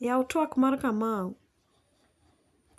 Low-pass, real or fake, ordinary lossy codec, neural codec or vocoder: 14.4 kHz; real; none; none